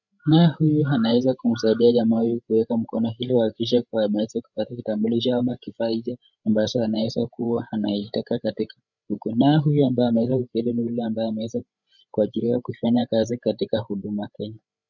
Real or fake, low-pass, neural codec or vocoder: fake; 7.2 kHz; codec, 16 kHz, 16 kbps, FreqCodec, larger model